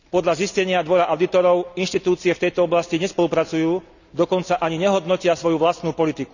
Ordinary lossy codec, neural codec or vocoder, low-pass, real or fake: none; none; 7.2 kHz; real